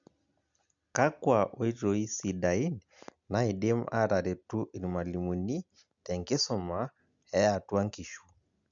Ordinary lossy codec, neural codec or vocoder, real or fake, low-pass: none; none; real; 7.2 kHz